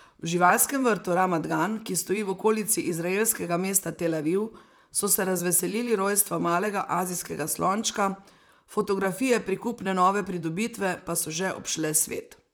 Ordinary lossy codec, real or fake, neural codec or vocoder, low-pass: none; fake; vocoder, 44.1 kHz, 128 mel bands, Pupu-Vocoder; none